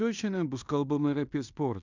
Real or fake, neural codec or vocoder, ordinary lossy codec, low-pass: fake; codec, 16 kHz in and 24 kHz out, 0.9 kbps, LongCat-Audio-Codec, fine tuned four codebook decoder; Opus, 64 kbps; 7.2 kHz